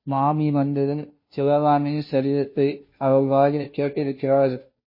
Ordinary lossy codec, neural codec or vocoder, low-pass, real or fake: MP3, 24 kbps; codec, 16 kHz, 0.5 kbps, FunCodec, trained on Chinese and English, 25 frames a second; 5.4 kHz; fake